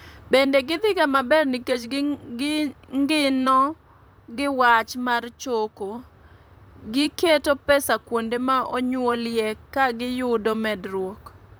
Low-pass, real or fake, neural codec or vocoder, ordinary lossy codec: none; fake; vocoder, 44.1 kHz, 128 mel bands, Pupu-Vocoder; none